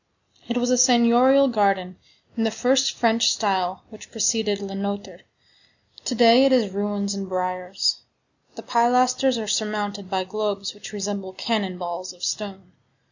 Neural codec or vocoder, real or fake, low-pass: none; real; 7.2 kHz